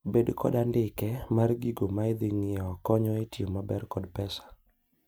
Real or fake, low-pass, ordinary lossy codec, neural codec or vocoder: real; none; none; none